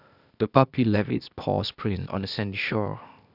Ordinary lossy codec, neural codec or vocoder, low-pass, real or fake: none; codec, 16 kHz, 0.8 kbps, ZipCodec; 5.4 kHz; fake